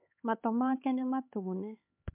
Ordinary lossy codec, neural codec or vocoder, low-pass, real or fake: MP3, 32 kbps; codec, 16 kHz, 4 kbps, X-Codec, HuBERT features, trained on LibriSpeech; 3.6 kHz; fake